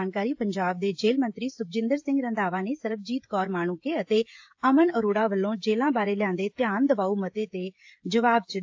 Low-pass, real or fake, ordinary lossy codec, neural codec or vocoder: 7.2 kHz; fake; AAC, 48 kbps; codec, 16 kHz, 16 kbps, FreqCodec, smaller model